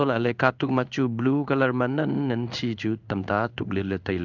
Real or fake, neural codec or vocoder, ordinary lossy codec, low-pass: fake; codec, 16 kHz in and 24 kHz out, 1 kbps, XY-Tokenizer; none; 7.2 kHz